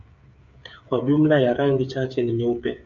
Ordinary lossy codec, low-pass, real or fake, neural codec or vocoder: MP3, 64 kbps; 7.2 kHz; fake; codec, 16 kHz, 8 kbps, FreqCodec, smaller model